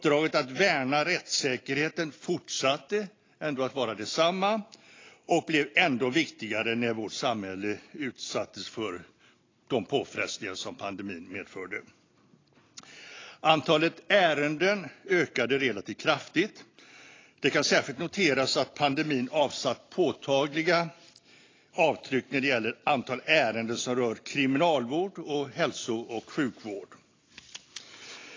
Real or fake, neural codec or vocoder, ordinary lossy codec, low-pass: real; none; AAC, 32 kbps; 7.2 kHz